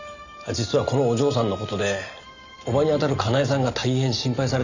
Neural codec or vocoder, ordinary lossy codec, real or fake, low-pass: none; none; real; 7.2 kHz